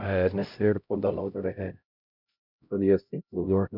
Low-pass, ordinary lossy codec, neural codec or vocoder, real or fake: 5.4 kHz; none; codec, 16 kHz, 0.5 kbps, X-Codec, HuBERT features, trained on LibriSpeech; fake